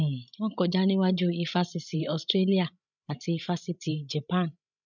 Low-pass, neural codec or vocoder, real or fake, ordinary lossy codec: 7.2 kHz; codec, 16 kHz, 16 kbps, FreqCodec, larger model; fake; none